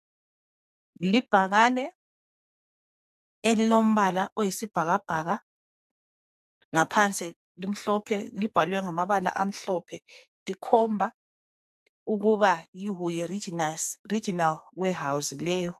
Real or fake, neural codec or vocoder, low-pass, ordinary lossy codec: fake; codec, 44.1 kHz, 2.6 kbps, SNAC; 14.4 kHz; AAC, 96 kbps